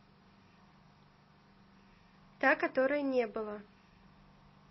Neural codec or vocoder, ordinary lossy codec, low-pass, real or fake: none; MP3, 24 kbps; 7.2 kHz; real